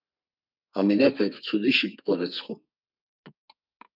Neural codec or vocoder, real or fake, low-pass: codec, 32 kHz, 1.9 kbps, SNAC; fake; 5.4 kHz